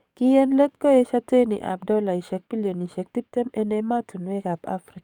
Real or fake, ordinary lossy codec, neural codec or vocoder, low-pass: fake; none; codec, 44.1 kHz, 7.8 kbps, DAC; 19.8 kHz